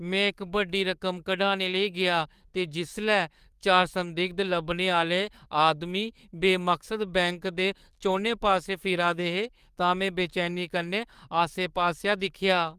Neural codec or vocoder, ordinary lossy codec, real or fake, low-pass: codec, 44.1 kHz, 7.8 kbps, DAC; Opus, 32 kbps; fake; 14.4 kHz